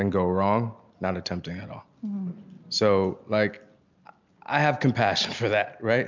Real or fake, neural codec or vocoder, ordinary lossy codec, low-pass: real; none; MP3, 64 kbps; 7.2 kHz